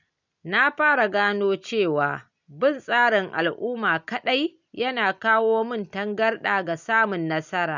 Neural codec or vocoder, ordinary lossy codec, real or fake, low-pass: none; none; real; 7.2 kHz